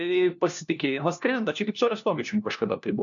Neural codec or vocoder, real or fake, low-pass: codec, 16 kHz, 1 kbps, FunCodec, trained on LibriTTS, 50 frames a second; fake; 7.2 kHz